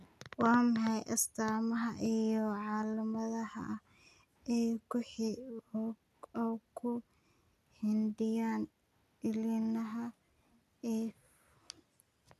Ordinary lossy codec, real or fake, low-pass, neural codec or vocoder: none; real; 14.4 kHz; none